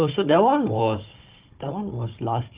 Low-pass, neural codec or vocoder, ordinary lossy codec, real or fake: 3.6 kHz; codec, 16 kHz, 4 kbps, FunCodec, trained on Chinese and English, 50 frames a second; Opus, 32 kbps; fake